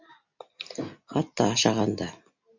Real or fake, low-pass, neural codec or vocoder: real; 7.2 kHz; none